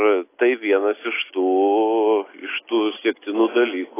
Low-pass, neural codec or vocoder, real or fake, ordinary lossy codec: 3.6 kHz; none; real; AAC, 16 kbps